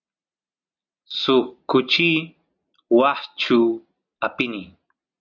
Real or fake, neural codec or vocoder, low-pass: real; none; 7.2 kHz